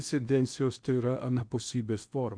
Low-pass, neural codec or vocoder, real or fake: 9.9 kHz; codec, 16 kHz in and 24 kHz out, 0.8 kbps, FocalCodec, streaming, 65536 codes; fake